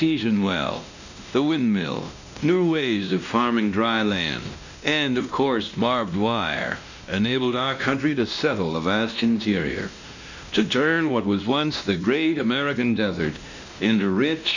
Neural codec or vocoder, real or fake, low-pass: codec, 16 kHz, 1 kbps, X-Codec, WavLM features, trained on Multilingual LibriSpeech; fake; 7.2 kHz